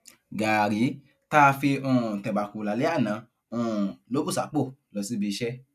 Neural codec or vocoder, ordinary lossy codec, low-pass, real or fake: none; none; 14.4 kHz; real